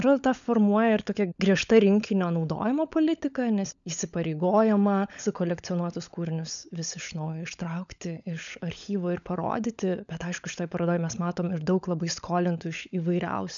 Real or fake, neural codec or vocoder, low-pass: fake; codec, 16 kHz, 16 kbps, FunCodec, trained on LibriTTS, 50 frames a second; 7.2 kHz